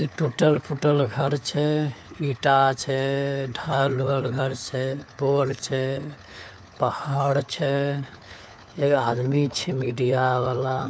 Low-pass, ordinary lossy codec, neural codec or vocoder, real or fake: none; none; codec, 16 kHz, 4 kbps, FunCodec, trained on LibriTTS, 50 frames a second; fake